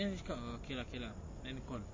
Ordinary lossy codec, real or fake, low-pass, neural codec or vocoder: MP3, 32 kbps; real; 7.2 kHz; none